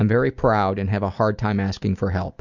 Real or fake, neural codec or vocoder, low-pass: real; none; 7.2 kHz